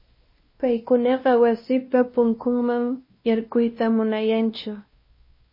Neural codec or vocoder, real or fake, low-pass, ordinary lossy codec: codec, 16 kHz, 1 kbps, X-Codec, WavLM features, trained on Multilingual LibriSpeech; fake; 5.4 kHz; MP3, 24 kbps